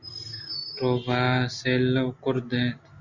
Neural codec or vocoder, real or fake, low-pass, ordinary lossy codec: none; real; 7.2 kHz; Opus, 64 kbps